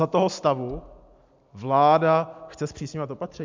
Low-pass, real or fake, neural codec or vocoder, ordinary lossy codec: 7.2 kHz; real; none; MP3, 64 kbps